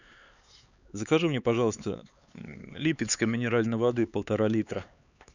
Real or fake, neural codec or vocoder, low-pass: fake; codec, 16 kHz, 4 kbps, X-Codec, HuBERT features, trained on LibriSpeech; 7.2 kHz